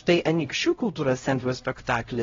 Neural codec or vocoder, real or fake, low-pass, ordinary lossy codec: codec, 16 kHz, 0.5 kbps, X-Codec, HuBERT features, trained on LibriSpeech; fake; 7.2 kHz; AAC, 24 kbps